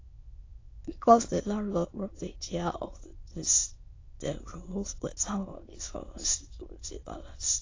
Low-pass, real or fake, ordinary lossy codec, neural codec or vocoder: 7.2 kHz; fake; AAC, 32 kbps; autoencoder, 22.05 kHz, a latent of 192 numbers a frame, VITS, trained on many speakers